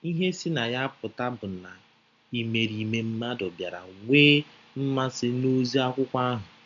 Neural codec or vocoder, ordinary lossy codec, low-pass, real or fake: none; none; 7.2 kHz; real